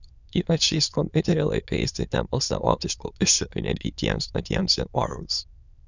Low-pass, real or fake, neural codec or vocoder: 7.2 kHz; fake; autoencoder, 22.05 kHz, a latent of 192 numbers a frame, VITS, trained on many speakers